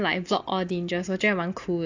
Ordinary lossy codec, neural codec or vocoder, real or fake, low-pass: AAC, 48 kbps; none; real; 7.2 kHz